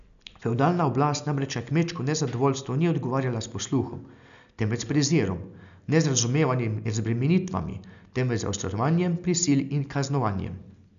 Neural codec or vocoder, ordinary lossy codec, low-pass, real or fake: none; none; 7.2 kHz; real